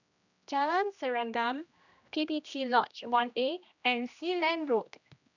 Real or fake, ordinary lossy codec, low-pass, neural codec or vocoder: fake; none; 7.2 kHz; codec, 16 kHz, 1 kbps, X-Codec, HuBERT features, trained on general audio